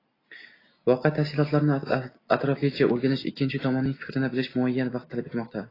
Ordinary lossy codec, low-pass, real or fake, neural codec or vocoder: AAC, 24 kbps; 5.4 kHz; real; none